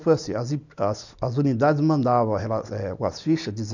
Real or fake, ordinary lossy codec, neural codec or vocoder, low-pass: real; none; none; 7.2 kHz